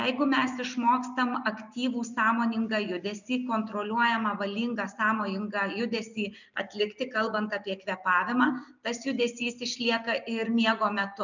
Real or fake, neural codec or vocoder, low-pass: real; none; 7.2 kHz